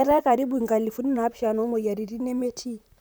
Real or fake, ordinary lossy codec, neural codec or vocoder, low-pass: fake; none; vocoder, 44.1 kHz, 128 mel bands, Pupu-Vocoder; none